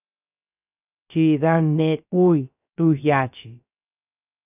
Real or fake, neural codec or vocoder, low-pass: fake; codec, 16 kHz, 0.3 kbps, FocalCodec; 3.6 kHz